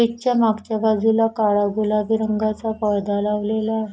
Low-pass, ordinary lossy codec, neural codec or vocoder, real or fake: none; none; none; real